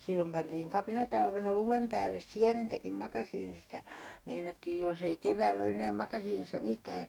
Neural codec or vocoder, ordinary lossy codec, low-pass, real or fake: codec, 44.1 kHz, 2.6 kbps, DAC; none; 19.8 kHz; fake